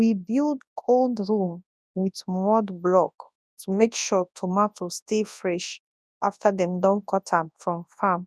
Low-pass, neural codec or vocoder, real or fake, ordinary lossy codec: none; codec, 24 kHz, 0.9 kbps, WavTokenizer, large speech release; fake; none